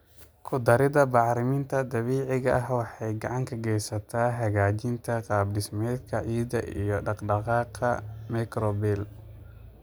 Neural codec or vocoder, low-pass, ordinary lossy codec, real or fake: none; none; none; real